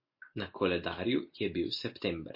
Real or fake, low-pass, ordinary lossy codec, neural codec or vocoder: real; 5.4 kHz; MP3, 32 kbps; none